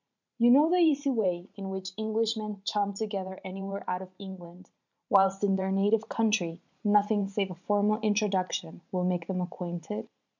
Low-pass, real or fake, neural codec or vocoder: 7.2 kHz; fake; vocoder, 44.1 kHz, 128 mel bands every 512 samples, BigVGAN v2